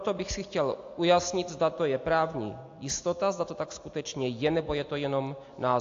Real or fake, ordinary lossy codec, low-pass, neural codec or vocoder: real; AAC, 48 kbps; 7.2 kHz; none